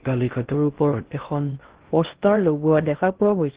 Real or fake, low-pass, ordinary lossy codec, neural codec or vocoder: fake; 3.6 kHz; Opus, 16 kbps; codec, 16 kHz in and 24 kHz out, 0.6 kbps, FocalCodec, streaming, 2048 codes